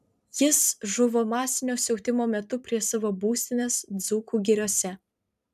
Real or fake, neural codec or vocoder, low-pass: fake; vocoder, 44.1 kHz, 128 mel bands every 512 samples, BigVGAN v2; 14.4 kHz